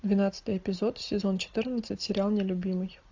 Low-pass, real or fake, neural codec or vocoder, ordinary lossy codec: 7.2 kHz; real; none; AAC, 48 kbps